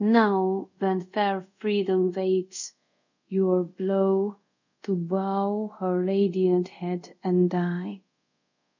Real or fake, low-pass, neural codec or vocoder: fake; 7.2 kHz; codec, 24 kHz, 0.5 kbps, DualCodec